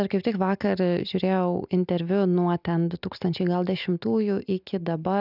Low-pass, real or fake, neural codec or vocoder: 5.4 kHz; real; none